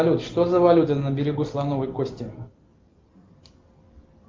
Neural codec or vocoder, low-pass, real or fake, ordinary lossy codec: none; 7.2 kHz; real; Opus, 24 kbps